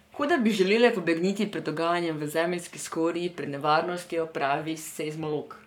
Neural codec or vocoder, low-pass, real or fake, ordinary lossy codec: codec, 44.1 kHz, 7.8 kbps, Pupu-Codec; 19.8 kHz; fake; none